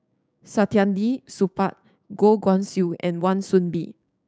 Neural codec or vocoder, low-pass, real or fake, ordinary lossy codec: codec, 16 kHz, 6 kbps, DAC; none; fake; none